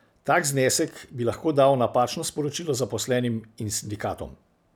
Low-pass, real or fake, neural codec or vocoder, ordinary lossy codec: none; real; none; none